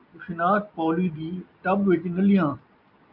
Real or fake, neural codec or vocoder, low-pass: real; none; 5.4 kHz